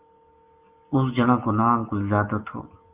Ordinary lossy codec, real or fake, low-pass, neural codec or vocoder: Opus, 32 kbps; real; 3.6 kHz; none